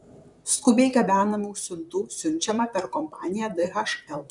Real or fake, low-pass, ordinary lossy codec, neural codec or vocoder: fake; 10.8 kHz; MP3, 96 kbps; vocoder, 44.1 kHz, 128 mel bands, Pupu-Vocoder